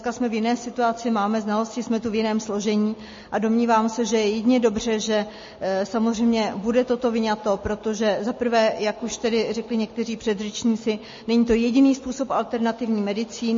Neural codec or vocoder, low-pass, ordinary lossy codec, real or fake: none; 7.2 kHz; MP3, 32 kbps; real